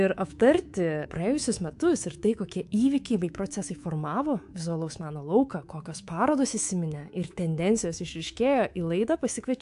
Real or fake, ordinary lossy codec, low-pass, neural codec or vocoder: fake; AAC, 64 kbps; 10.8 kHz; codec, 24 kHz, 3.1 kbps, DualCodec